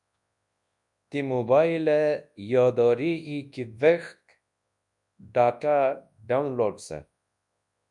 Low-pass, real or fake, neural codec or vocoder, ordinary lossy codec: 10.8 kHz; fake; codec, 24 kHz, 0.9 kbps, WavTokenizer, large speech release; MP3, 96 kbps